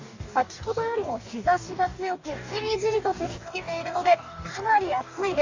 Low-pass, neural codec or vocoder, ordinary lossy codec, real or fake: 7.2 kHz; codec, 44.1 kHz, 2.6 kbps, DAC; AAC, 48 kbps; fake